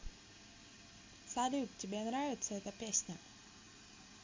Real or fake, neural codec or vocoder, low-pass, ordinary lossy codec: real; none; 7.2 kHz; MP3, 48 kbps